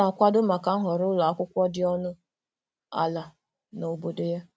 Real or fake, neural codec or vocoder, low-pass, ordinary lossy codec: real; none; none; none